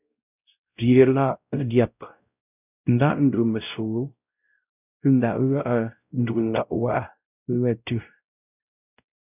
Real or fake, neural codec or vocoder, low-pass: fake; codec, 16 kHz, 0.5 kbps, X-Codec, WavLM features, trained on Multilingual LibriSpeech; 3.6 kHz